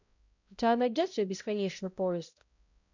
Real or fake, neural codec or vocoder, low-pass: fake; codec, 16 kHz, 0.5 kbps, X-Codec, HuBERT features, trained on balanced general audio; 7.2 kHz